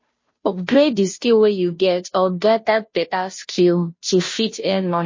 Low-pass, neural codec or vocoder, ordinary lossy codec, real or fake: 7.2 kHz; codec, 16 kHz, 0.5 kbps, FunCodec, trained on Chinese and English, 25 frames a second; MP3, 32 kbps; fake